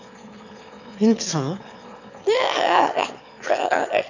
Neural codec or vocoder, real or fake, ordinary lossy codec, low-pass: autoencoder, 22.05 kHz, a latent of 192 numbers a frame, VITS, trained on one speaker; fake; none; 7.2 kHz